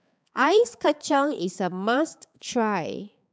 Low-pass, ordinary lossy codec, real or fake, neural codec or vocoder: none; none; fake; codec, 16 kHz, 4 kbps, X-Codec, HuBERT features, trained on balanced general audio